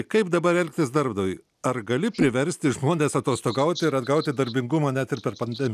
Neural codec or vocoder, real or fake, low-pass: none; real; 14.4 kHz